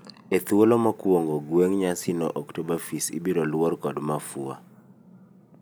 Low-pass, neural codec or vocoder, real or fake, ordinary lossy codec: none; none; real; none